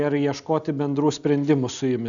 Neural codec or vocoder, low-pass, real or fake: none; 7.2 kHz; real